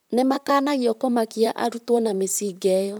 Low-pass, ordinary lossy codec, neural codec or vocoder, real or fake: none; none; vocoder, 44.1 kHz, 128 mel bands, Pupu-Vocoder; fake